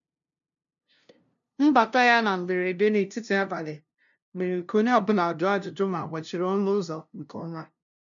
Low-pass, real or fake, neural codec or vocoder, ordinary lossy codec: 7.2 kHz; fake; codec, 16 kHz, 0.5 kbps, FunCodec, trained on LibriTTS, 25 frames a second; none